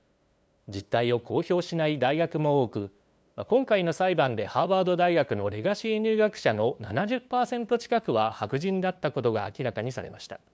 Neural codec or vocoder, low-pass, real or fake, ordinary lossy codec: codec, 16 kHz, 2 kbps, FunCodec, trained on LibriTTS, 25 frames a second; none; fake; none